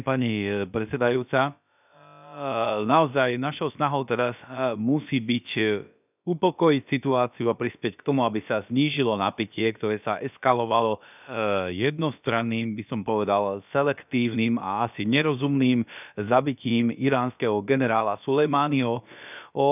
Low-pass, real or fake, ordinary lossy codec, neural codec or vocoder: 3.6 kHz; fake; none; codec, 16 kHz, about 1 kbps, DyCAST, with the encoder's durations